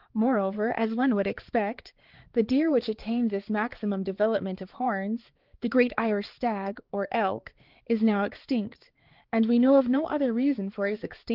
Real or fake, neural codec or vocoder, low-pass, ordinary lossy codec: fake; codec, 16 kHz, 4 kbps, X-Codec, HuBERT features, trained on balanced general audio; 5.4 kHz; Opus, 16 kbps